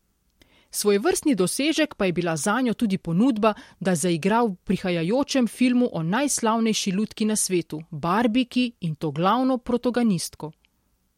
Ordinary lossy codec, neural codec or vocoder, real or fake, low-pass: MP3, 64 kbps; none; real; 19.8 kHz